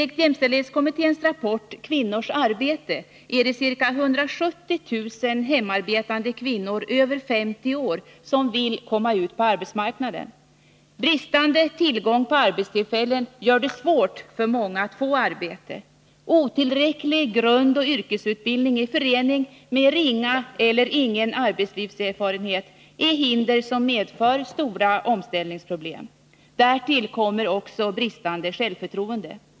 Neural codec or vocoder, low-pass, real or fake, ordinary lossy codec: none; none; real; none